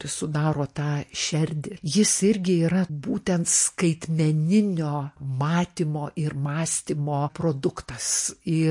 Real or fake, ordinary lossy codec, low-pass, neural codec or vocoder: real; MP3, 48 kbps; 10.8 kHz; none